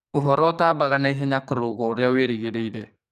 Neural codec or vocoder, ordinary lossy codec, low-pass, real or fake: codec, 44.1 kHz, 2.6 kbps, SNAC; none; 14.4 kHz; fake